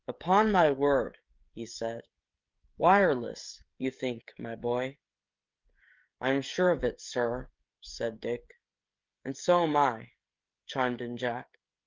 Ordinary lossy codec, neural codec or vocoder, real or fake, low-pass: Opus, 24 kbps; codec, 16 kHz, 16 kbps, FreqCodec, smaller model; fake; 7.2 kHz